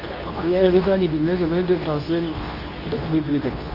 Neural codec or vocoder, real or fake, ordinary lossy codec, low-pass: codec, 24 kHz, 0.9 kbps, WavTokenizer, medium speech release version 2; fake; Opus, 32 kbps; 5.4 kHz